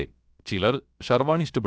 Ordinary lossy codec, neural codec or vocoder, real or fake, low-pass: none; codec, 16 kHz, about 1 kbps, DyCAST, with the encoder's durations; fake; none